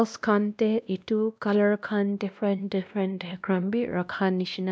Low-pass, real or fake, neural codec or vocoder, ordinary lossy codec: none; fake; codec, 16 kHz, 1 kbps, X-Codec, WavLM features, trained on Multilingual LibriSpeech; none